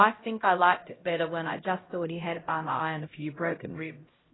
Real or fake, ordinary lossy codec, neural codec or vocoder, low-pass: fake; AAC, 16 kbps; codec, 16 kHz, 0.5 kbps, X-Codec, HuBERT features, trained on LibriSpeech; 7.2 kHz